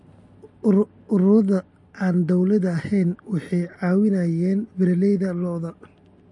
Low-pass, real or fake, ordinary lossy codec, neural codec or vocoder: 10.8 kHz; real; MP3, 48 kbps; none